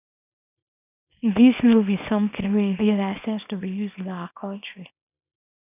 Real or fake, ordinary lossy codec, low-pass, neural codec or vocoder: fake; none; 3.6 kHz; codec, 24 kHz, 0.9 kbps, WavTokenizer, small release